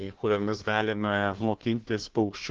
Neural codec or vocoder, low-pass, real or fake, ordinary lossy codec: codec, 16 kHz, 1 kbps, FunCodec, trained on Chinese and English, 50 frames a second; 7.2 kHz; fake; Opus, 16 kbps